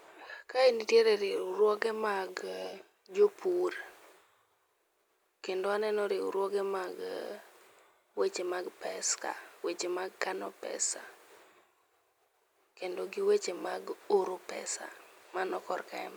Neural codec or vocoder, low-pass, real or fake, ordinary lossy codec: none; none; real; none